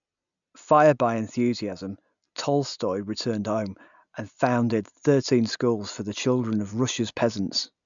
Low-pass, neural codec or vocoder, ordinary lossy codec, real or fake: 7.2 kHz; none; none; real